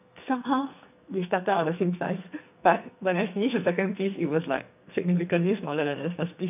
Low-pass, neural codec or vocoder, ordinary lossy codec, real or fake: 3.6 kHz; codec, 44.1 kHz, 2.6 kbps, SNAC; none; fake